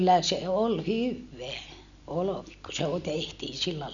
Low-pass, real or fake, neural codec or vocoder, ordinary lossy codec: 7.2 kHz; real; none; MP3, 96 kbps